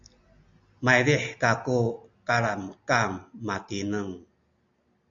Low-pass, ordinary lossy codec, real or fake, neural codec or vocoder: 7.2 kHz; AAC, 64 kbps; real; none